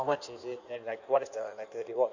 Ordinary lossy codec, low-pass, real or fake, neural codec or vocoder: none; 7.2 kHz; fake; codec, 16 kHz in and 24 kHz out, 1.1 kbps, FireRedTTS-2 codec